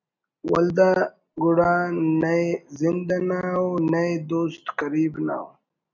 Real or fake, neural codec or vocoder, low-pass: real; none; 7.2 kHz